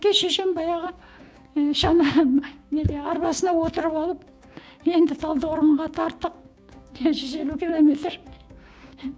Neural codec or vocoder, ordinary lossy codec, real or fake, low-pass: codec, 16 kHz, 6 kbps, DAC; none; fake; none